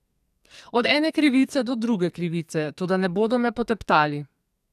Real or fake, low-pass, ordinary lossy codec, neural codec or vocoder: fake; 14.4 kHz; none; codec, 44.1 kHz, 2.6 kbps, SNAC